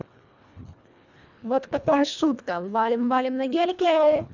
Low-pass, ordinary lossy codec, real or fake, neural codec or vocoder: 7.2 kHz; none; fake; codec, 24 kHz, 1.5 kbps, HILCodec